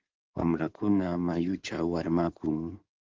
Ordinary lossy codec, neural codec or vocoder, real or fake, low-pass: Opus, 16 kbps; codec, 16 kHz in and 24 kHz out, 2.2 kbps, FireRedTTS-2 codec; fake; 7.2 kHz